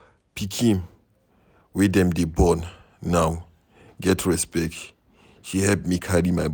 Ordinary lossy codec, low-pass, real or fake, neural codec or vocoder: none; none; real; none